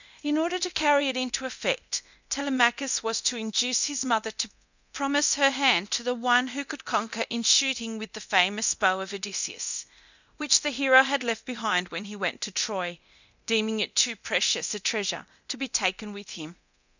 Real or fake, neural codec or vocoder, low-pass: fake; codec, 24 kHz, 0.9 kbps, DualCodec; 7.2 kHz